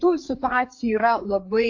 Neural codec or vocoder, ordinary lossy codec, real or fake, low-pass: codec, 16 kHz, 4 kbps, FreqCodec, larger model; AAC, 48 kbps; fake; 7.2 kHz